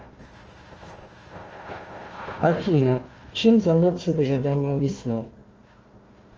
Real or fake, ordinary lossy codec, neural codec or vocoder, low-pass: fake; Opus, 24 kbps; codec, 16 kHz, 1 kbps, FunCodec, trained on Chinese and English, 50 frames a second; 7.2 kHz